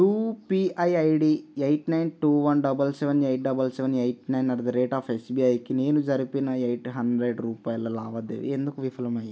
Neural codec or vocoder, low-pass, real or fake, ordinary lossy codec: none; none; real; none